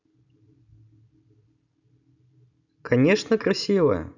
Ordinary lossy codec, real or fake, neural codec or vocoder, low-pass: none; real; none; 7.2 kHz